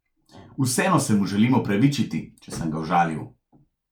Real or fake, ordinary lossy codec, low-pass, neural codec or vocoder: real; none; 19.8 kHz; none